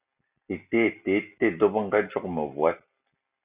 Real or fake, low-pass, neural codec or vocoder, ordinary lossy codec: real; 3.6 kHz; none; Opus, 64 kbps